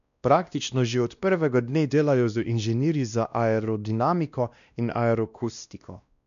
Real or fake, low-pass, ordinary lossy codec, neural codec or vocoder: fake; 7.2 kHz; none; codec, 16 kHz, 1 kbps, X-Codec, WavLM features, trained on Multilingual LibriSpeech